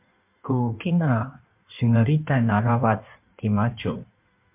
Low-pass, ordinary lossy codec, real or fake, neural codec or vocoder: 3.6 kHz; MP3, 32 kbps; fake; codec, 16 kHz in and 24 kHz out, 1.1 kbps, FireRedTTS-2 codec